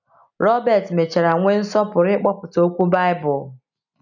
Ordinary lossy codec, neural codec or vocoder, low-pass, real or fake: none; none; 7.2 kHz; real